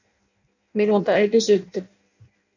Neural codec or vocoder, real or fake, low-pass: codec, 16 kHz in and 24 kHz out, 0.6 kbps, FireRedTTS-2 codec; fake; 7.2 kHz